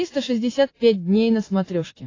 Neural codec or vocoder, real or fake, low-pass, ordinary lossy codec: none; real; 7.2 kHz; AAC, 32 kbps